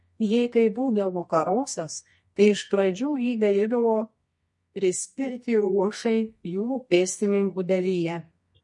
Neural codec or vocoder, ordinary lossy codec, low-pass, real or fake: codec, 24 kHz, 0.9 kbps, WavTokenizer, medium music audio release; MP3, 48 kbps; 10.8 kHz; fake